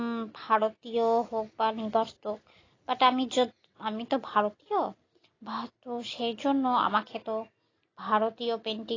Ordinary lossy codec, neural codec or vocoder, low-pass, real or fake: AAC, 32 kbps; none; 7.2 kHz; real